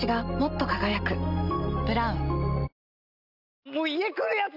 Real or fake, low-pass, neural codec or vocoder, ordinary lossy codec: real; 5.4 kHz; none; none